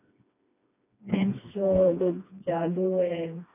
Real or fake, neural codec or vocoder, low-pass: fake; codec, 16 kHz, 2 kbps, FreqCodec, smaller model; 3.6 kHz